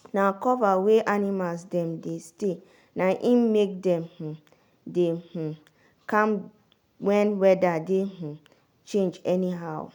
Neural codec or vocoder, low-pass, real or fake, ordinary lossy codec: none; 19.8 kHz; real; none